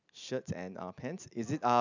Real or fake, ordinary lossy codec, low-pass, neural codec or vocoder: real; none; 7.2 kHz; none